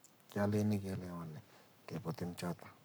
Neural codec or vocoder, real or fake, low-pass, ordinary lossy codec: codec, 44.1 kHz, 7.8 kbps, Pupu-Codec; fake; none; none